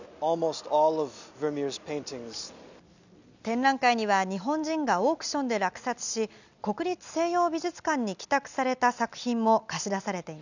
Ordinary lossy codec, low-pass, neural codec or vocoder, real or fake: none; 7.2 kHz; none; real